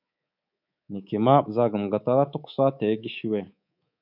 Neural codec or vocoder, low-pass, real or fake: codec, 24 kHz, 3.1 kbps, DualCodec; 5.4 kHz; fake